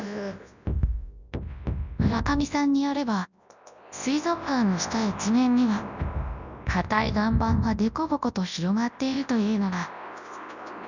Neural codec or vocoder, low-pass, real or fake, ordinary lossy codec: codec, 24 kHz, 0.9 kbps, WavTokenizer, large speech release; 7.2 kHz; fake; none